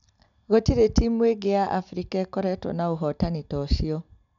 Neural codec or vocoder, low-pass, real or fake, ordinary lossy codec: none; 7.2 kHz; real; none